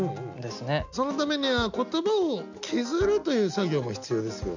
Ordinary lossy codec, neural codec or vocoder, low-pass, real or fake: none; none; 7.2 kHz; real